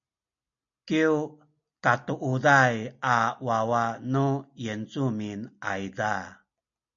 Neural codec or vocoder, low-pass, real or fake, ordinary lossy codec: none; 7.2 kHz; real; MP3, 48 kbps